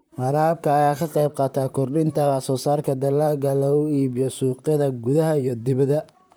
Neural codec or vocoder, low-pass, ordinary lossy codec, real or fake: vocoder, 44.1 kHz, 128 mel bands, Pupu-Vocoder; none; none; fake